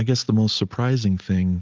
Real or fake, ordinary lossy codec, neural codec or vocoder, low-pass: real; Opus, 32 kbps; none; 7.2 kHz